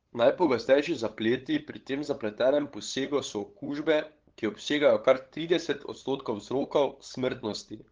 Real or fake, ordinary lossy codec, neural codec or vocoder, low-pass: fake; Opus, 16 kbps; codec, 16 kHz, 8 kbps, FunCodec, trained on LibriTTS, 25 frames a second; 7.2 kHz